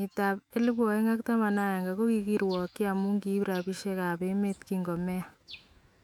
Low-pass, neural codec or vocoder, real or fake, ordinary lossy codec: 19.8 kHz; autoencoder, 48 kHz, 128 numbers a frame, DAC-VAE, trained on Japanese speech; fake; none